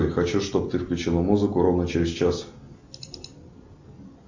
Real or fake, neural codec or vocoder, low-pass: real; none; 7.2 kHz